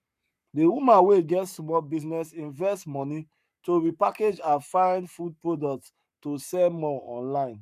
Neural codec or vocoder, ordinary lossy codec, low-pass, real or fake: codec, 44.1 kHz, 7.8 kbps, Pupu-Codec; none; 14.4 kHz; fake